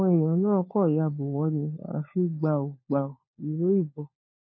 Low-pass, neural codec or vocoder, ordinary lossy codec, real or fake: 7.2 kHz; codec, 16 kHz, 4 kbps, FunCodec, trained on LibriTTS, 50 frames a second; MP3, 24 kbps; fake